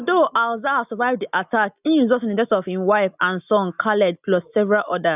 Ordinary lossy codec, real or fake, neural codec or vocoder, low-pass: none; real; none; 3.6 kHz